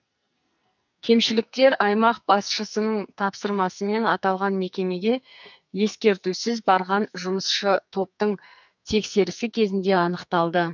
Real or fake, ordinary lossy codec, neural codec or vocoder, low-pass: fake; none; codec, 44.1 kHz, 2.6 kbps, SNAC; 7.2 kHz